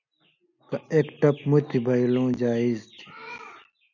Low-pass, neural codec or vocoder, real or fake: 7.2 kHz; none; real